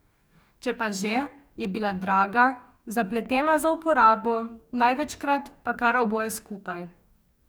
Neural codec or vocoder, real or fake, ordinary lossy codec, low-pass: codec, 44.1 kHz, 2.6 kbps, DAC; fake; none; none